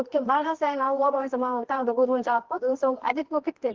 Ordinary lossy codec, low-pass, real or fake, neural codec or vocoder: Opus, 16 kbps; 7.2 kHz; fake; codec, 24 kHz, 0.9 kbps, WavTokenizer, medium music audio release